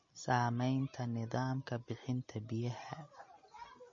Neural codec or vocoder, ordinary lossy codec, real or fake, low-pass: none; MP3, 32 kbps; real; 7.2 kHz